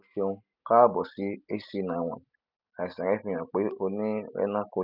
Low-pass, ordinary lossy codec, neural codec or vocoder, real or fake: 5.4 kHz; none; none; real